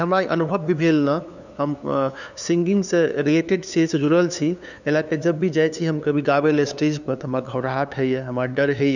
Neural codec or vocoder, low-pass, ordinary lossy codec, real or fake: codec, 16 kHz, 2 kbps, FunCodec, trained on LibriTTS, 25 frames a second; 7.2 kHz; none; fake